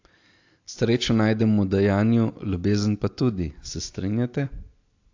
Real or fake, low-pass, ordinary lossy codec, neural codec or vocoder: real; 7.2 kHz; AAC, 48 kbps; none